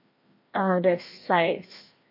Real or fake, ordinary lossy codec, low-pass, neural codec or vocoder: fake; MP3, 32 kbps; 5.4 kHz; codec, 16 kHz, 1 kbps, FreqCodec, larger model